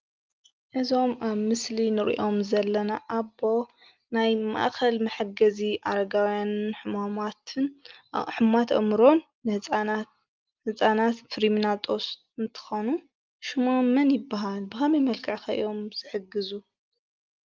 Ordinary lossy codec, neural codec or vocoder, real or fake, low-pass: Opus, 24 kbps; none; real; 7.2 kHz